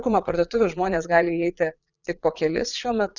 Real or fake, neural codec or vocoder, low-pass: fake; vocoder, 22.05 kHz, 80 mel bands, Vocos; 7.2 kHz